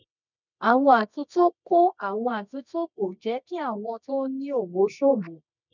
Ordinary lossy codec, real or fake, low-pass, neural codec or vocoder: none; fake; 7.2 kHz; codec, 24 kHz, 0.9 kbps, WavTokenizer, medium music audio release